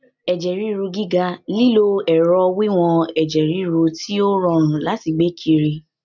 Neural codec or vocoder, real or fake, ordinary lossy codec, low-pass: none; real; none; 7.2 kHz